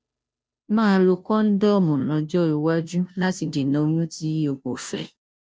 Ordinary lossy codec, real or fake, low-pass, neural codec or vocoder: none; fake; none; codec, 16 kHz, 0.5 kbps, FunCodec, trained on Chinese and English, 25 frames a second